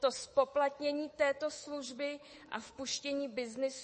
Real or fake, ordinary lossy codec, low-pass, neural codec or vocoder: fake; MP3, 32 kbps; 10.8 kHz; codec, 24 kHz, 3.1 kbps, DualCodec